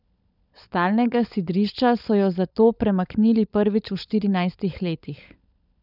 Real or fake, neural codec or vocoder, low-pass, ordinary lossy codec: fake; codec, 16 kHz, 16 kbps, FunCodec, trained on LibriTTS, 50 frames a second; 5.4 kHz; none